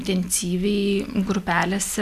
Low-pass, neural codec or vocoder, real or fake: 14.4 kHz; none; real